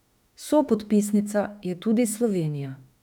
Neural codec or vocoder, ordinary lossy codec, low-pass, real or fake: autoencoder, 48 kHz, 32 numbers a frame, DAC-VAE, trained on Japanese speech; none; 19.8 kHz; fake